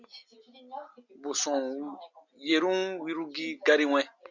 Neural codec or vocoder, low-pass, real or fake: none; 7.2 kHz; real